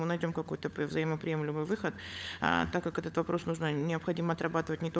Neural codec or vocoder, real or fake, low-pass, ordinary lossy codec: codec, 16 kHz, 16 kbps, FunCodec, trained on LibriTTS, 50 frames a second; fake; none; none